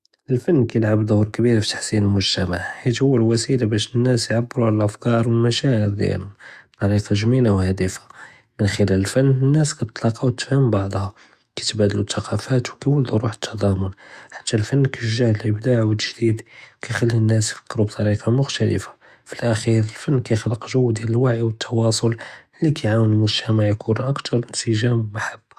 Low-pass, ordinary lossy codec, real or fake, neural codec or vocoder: 14.4 kHz; Opus, 64 kbps; fake; autoencoder, 48 kHz, 128 numbers a frame, DAC-VAE, trained on Japanese speech